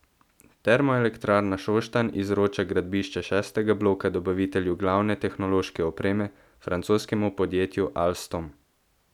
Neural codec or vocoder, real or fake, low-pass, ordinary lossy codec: none; real; 19.8 kHz; none